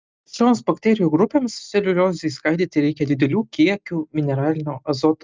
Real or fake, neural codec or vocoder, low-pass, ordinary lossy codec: real; none; 7.2 kHz; Opus, 24 kbps